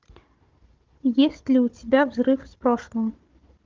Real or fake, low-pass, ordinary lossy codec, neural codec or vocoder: fake; 7.2 kHz; Opus, 32 kbps; codec, 16 kHz, 16 kbps, FunCodec, trained on Chinese and English, 50 frames a second